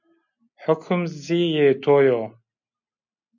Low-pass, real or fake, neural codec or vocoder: 7.2 kHz; real; none